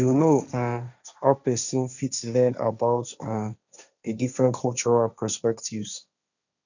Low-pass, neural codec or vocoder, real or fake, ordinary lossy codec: 7.2 kHz; codec, 16 kHz, 1.1 kbps, Voila-Tokenizer; fake; none